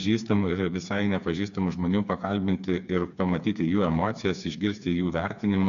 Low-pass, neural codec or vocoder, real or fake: 7.2 kHz; codec, 16 kHz, 4 kbps, FreqCodec, smaller model; fake